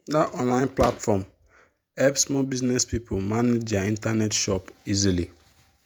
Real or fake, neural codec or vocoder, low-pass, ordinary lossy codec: fake; vocoder, 48 kHz, 128 mel bands, Vocos; none; none